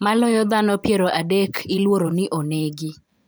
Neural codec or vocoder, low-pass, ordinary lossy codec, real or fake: vocoder, 44.1 kHz, 128 mel bands every 512 samples, BigVGAN v2; none; none; fake